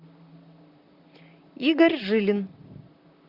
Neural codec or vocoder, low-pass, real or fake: vocoder, 44.1 kHz, 128 mel bands, Pupu-Vocoder; 5.4 kHz; fake